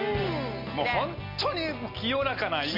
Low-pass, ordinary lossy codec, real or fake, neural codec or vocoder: 5.4 kHz; MP3, 48 kbps; real; none